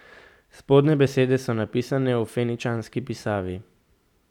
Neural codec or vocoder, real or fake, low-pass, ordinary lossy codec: none; real; 19.8 kHz; none